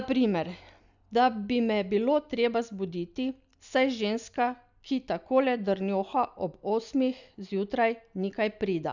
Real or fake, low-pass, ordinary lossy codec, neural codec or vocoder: real; 7.2 kHz; none; none